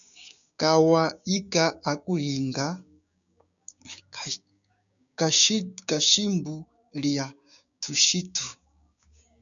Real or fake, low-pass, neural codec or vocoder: fake; 7.2 kHz; codec, 16 kHz, 6 kbps, DAC